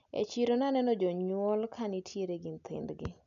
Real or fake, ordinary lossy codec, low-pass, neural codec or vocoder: real; none; 7.2 kHz; none